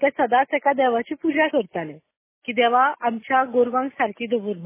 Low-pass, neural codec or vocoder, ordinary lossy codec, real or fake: 3.6 kHz; none; MP3, 16 kbps; real